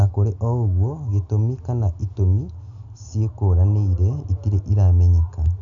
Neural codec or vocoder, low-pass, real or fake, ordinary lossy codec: none; 7.2 kHz; real; none